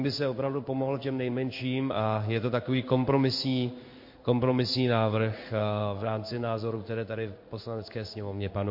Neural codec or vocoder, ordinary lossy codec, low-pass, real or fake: codec, 16 kHz in and 24 kHz out, 1 kbps, XY-Tokenizer; MP3, 32 kbps; 5.4 kHz; fake